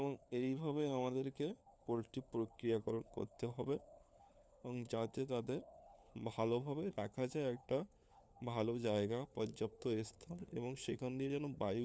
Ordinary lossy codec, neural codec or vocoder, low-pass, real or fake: none; codec, 16 kHz, 4 kbps, FunCodec, trained on LibriTTS, 50 frames a second; none; fake